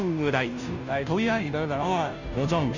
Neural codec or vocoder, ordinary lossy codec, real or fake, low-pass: codec, 16 kHz, 0.5 kbps, FunCodec, trained on Chinese and English, 25 frames a second; none; fake; 7.2 kHz